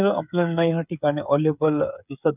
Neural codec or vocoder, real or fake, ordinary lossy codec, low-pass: codec, 16 kHz, 8 kbps, FreqCodec, smaller model; fake; none; 3.6 kHz